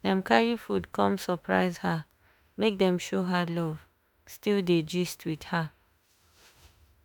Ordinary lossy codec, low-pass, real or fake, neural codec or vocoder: none; none; fake; autoencoder, 48 kHz, 32 numbers a frame, DAC-VAE, trained on Japanese speech